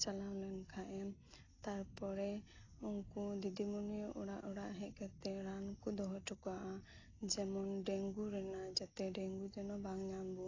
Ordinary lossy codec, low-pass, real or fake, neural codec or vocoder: AAC, 32 kbps; 7.2 kHz; real; none